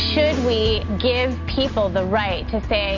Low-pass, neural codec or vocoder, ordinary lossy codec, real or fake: 7.2 kHz; none; MP3, 64 kbps; real